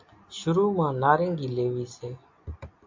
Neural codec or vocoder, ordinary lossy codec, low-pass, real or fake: none; MP3, 48 kbps; 7.2 kHz; real